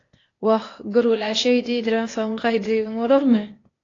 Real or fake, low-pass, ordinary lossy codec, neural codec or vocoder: fake; 7.2 kHz; AAC, 32 kbps; codec, 16 kHz, 0.8 kbps, ZipCodec